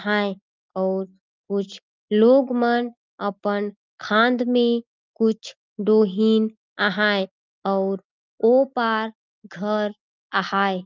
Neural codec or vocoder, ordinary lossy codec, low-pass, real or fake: none; Opus, 24 kbps; 7.2 kHz; real